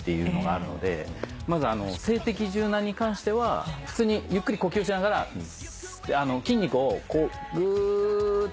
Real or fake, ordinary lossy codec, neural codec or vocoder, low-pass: real; none; none; none